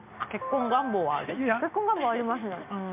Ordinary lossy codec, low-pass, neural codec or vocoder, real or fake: MP3, 32 kbps; 3.6 kHz; none; real